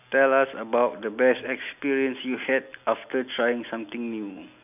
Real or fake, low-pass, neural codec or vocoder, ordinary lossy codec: real; 3.6 kHz; none; none